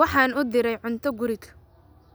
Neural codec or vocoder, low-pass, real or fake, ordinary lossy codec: none; none; real; none